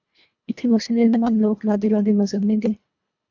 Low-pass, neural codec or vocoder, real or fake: 7.2 kHz; codec, 24 kHz, 1.5 kbps, HILCodec; fake